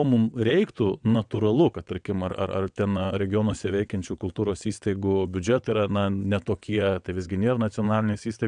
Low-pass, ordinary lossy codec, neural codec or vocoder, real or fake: 9.9 kHz; MP3, 96 kbps; vocoder, 22.05 kHz, 80 mel bands, WaveNeXt; fake